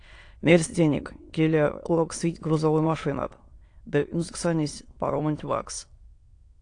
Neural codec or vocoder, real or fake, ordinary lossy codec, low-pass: autoencoder, 22.05 kHz, a latent of 192 numbers a frame, VITS, trained on many speakers; fake; AAC, 48 kbps; 9.9 kHz